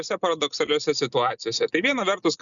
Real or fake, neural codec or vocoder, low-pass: real; none; 7.2 kHz